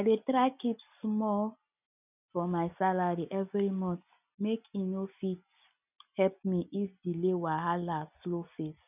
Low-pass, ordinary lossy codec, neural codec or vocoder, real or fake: 3.6 kHz; none; none; real